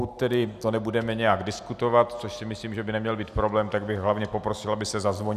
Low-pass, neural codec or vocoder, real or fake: 14.4 kHz; none; real